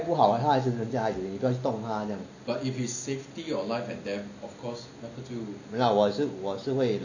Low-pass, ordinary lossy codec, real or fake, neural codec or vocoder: 7.2 kHz; none; real; none